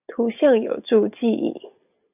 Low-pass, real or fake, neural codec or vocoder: 3.6 kHz; real; none